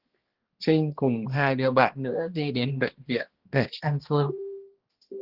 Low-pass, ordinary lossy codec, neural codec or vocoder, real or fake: 5.4 kHz; Opus, 16 kbps; codec, 16 kHz, 1 kbps, X-Codec, HuBERT features, trained on balanced general audio; fake